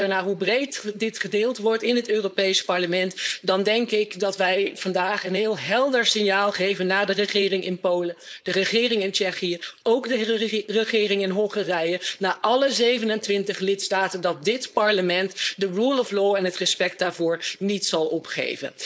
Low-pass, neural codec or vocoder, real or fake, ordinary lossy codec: none; codec, 16 kHz, 4.8 kbps, FACodec; fake; none